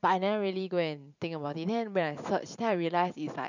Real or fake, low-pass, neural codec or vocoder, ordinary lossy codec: fake; 7.2 kHz; vocoder, 44.1 kHz, 128 mel bands every 512 samples, BigVGAN v2; none